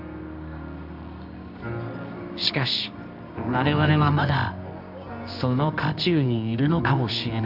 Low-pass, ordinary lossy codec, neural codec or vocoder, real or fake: 5.4 kHz; none; codec, 24 kHz, 0.9 kbps, WavTokenizer, medium music audio release; fake